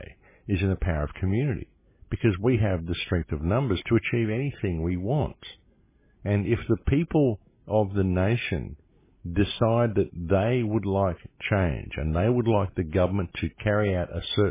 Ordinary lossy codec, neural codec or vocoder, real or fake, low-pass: MP3, 16 kbps; none; real; 3.6 kHz